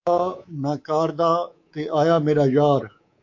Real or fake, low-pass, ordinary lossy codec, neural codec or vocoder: fake; 7.2 kHz; AAC, 48 kbps; codec, 24 kHz, 3.1 kbps, DualCodec